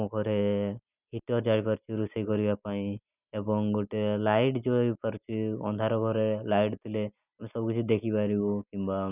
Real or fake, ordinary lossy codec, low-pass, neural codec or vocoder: real; none; 3.6 kHz; none